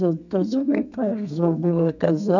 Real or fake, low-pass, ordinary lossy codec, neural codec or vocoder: fake; 7.2 kHz; none; codec, 32 kHz, 1.9 kbps, SNAC